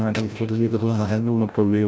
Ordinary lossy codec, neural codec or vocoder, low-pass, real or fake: none; codec, 16 kHz, 0.5 kbps, FreqCodec, larger model; none; fake